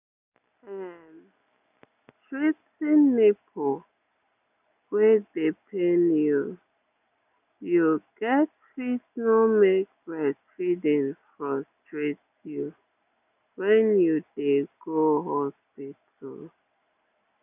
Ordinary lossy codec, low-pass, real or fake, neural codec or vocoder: none; 3.6 kHz; real; none